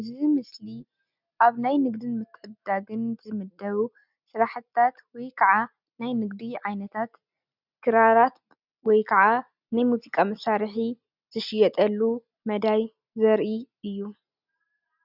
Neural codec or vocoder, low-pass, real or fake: none; 5.4 kHz; real